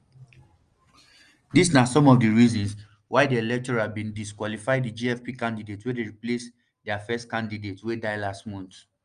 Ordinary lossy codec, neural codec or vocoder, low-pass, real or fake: Opus, 32 kbps; none; 9.9 kHz; real